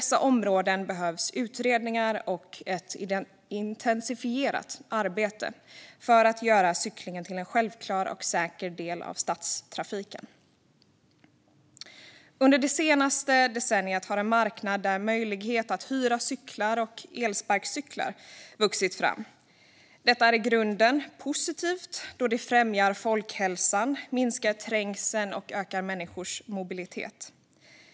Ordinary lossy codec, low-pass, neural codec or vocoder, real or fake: none; none; none; real